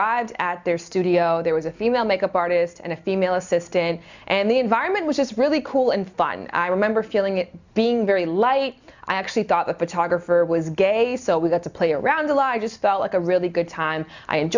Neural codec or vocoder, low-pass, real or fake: none; 7.2 kHz; real